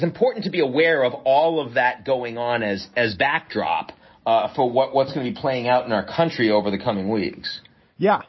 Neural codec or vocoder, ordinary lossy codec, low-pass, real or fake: none; MP3, 24 kbps; 7.2 kHz; real